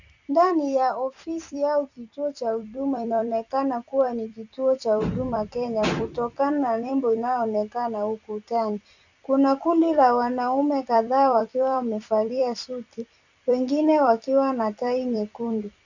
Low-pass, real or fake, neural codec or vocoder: 7.2 kHz; fake; vocoder, 44.1 kHz, 128 mel bands every 256 samples, BigVGAN v2